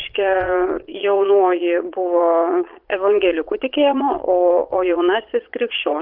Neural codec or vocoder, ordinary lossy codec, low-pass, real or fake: vocoder, 22.05 kHz, 80 mel bands, Vocos; Opus, 24 kbps; 5.4 kHz; fake